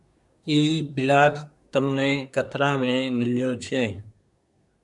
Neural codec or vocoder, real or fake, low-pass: codec, 24 kHz, 1 kbps, SNAC; fake; 10.8 kHz